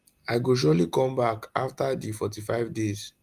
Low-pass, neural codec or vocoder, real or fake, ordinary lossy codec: 14.4 kHz; none; real; Opus, 32 kbps